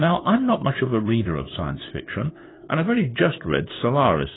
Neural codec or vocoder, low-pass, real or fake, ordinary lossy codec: codec, 16 kHz, 2 kbps, FunCodec, trained on LibriTTS, 25 frames a second; 7.2 kHz; fake; AAC, 16 kbps